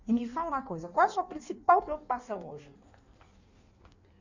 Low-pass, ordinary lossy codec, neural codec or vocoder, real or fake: 7.2 kHz; none; codec, 16 kHz in and 24 kHz out, 1.1 kbps, FireRedTTS-2 codec; fake